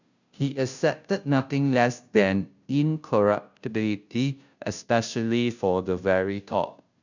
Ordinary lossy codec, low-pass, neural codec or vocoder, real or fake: none; 7.2 kHz; codec, 16 kHz, 0.5 kbps, FunCodec, trained on Chinese and English, 25 frames a second; fake